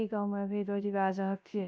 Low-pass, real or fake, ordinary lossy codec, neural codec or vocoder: none; fake; none; codec, 16 kHz, 0.3 kbps, FocalCodec